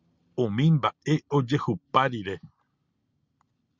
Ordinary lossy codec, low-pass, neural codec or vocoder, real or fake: Opus, 64 kbps; 7.2 kHz; none; real